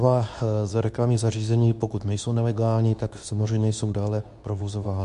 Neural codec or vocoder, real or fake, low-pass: codec, 24 kHz, 0.9 kbps, WavTokenizer, medium speech release version 2; fake; 10.8 kHz